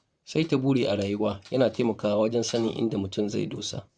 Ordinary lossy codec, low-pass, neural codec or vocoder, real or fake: Opus, 64 kbps; 9.9 kHz; vocoder, 44.1 kHz, 128 mel bands, Pupu-Vocoder; fake